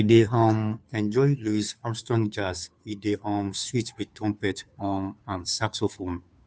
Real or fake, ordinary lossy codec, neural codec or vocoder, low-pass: fake; none; codec, 16 kHz, 2 kbps, FunCodec, trained on Chinese and English, 25 frames a second; none